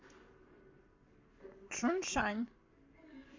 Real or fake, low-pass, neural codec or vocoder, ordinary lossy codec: fake; 7.2 kHz; codec, 44.1 kHz, 7.8 kbps, Pupu-Codec; MP3, 64 kbps